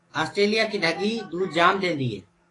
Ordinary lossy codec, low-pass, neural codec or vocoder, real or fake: AAC, 32 kbps; 10.8 kHz; codec, 44.1 kHz, 7.8 kbps, DAC; fake